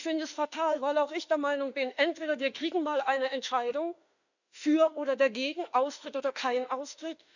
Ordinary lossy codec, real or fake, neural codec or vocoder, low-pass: none; fake; autoencoder, 48 kHz, 32 numbers a frame, DAC-VAE, trained on Japanese speech; 7.2 kHz